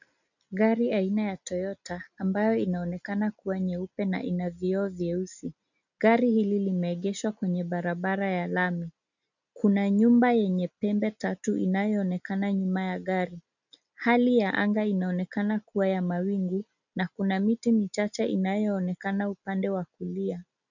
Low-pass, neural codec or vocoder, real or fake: 7.2 kHz; none; real